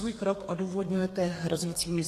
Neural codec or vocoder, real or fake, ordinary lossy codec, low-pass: codec, 32 kHz, 1.9 kbps, SNAC; fake; AAC, 48 kbps; 14.4 kHz